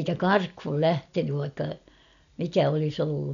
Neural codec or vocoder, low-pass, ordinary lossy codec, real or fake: none; 7.2 kHz; none; real